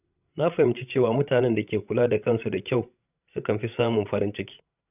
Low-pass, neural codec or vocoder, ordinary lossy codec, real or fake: 3.6 kHz; codec, 16 kHz, 16 kbps, FreqCodec, larger model; none; fake